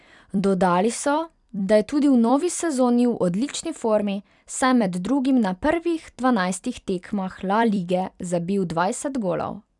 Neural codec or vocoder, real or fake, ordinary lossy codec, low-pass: vocoder, 44.1 kHz, 128 mel bands every 256 samples, BigVGAN v2; fake; none; 10.8 kHz